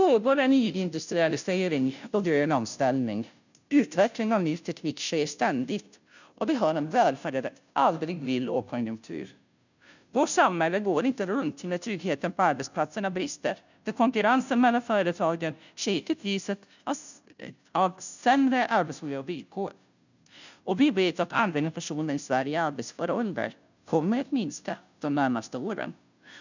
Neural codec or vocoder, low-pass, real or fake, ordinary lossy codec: codec, 16 kHz, 0.5 kbps, FunCodec, trained on Chinese and English, 25 frames a second; 7.2 kHz; fake; none